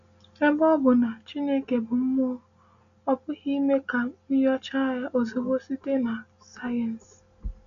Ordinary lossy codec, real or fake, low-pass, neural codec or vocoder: none; real; 7.2 kHz; none